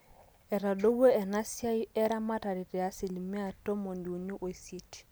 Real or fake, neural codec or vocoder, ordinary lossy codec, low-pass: real; none; none; none